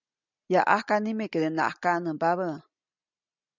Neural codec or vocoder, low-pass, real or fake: none; 7.2 kHz; real